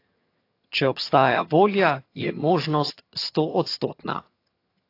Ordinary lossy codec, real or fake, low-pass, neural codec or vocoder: AAC, 32 kbps; fake; 5.4 kHz; vocoder, 22.05 kHz, 80 mel bands, HiFi-GAN